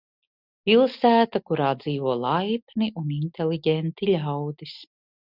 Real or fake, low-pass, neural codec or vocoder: real; 5.4 kHz; none